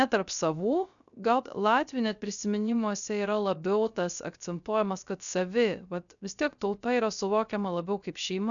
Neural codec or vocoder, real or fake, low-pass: codec, 16 kHz, 0.3 kbps, FocalCodec; fake; 7.2 kHz